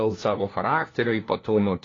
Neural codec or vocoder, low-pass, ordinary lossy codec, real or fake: codec, 16 kHz, 1 kbps, FunCodec, trained on LibriTTS, 50 frames a second; 7.2 kHz; AAC, 32 kbps; fake